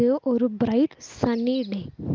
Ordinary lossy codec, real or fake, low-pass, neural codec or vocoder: Opus, 24 kbps; real; 7.2 kHz; none